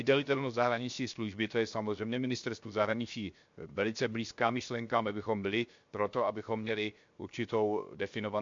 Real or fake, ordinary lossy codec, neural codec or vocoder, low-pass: fake; MP3, 48 kbps; codec, 16 kHz, 0.7 kbps, FocalCodec; 7.2 kHz